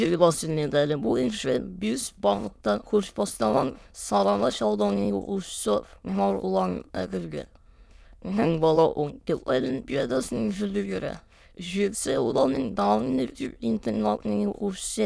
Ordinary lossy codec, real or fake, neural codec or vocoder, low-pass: none; fake; autoencoder, 22.05 kHz, a latent of 192 numbers a frame, VITS, trained on many speakers; none